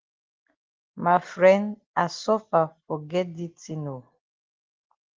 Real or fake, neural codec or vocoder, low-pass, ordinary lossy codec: real; none; 7.2 kHz; Opus, 16 kbps